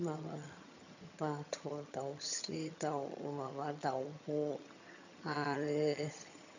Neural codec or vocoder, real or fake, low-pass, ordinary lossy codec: vocoder, 22.05 kHz, 80 mel bands, HiFi-GAN; fake; 7.2 kHz; none